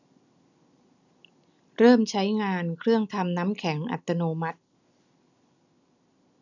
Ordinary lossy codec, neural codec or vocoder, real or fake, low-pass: none; none; real; 7.2 kHz